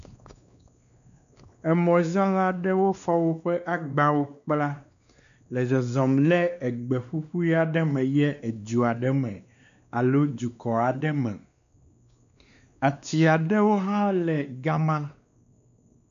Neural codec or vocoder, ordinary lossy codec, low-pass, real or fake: codec, 16 kHz, 2 kbps, X-Codec, WavLM features, trained on Multilingual LibriSpeech; MP3, 96 kbps; 7.2 kHz; fake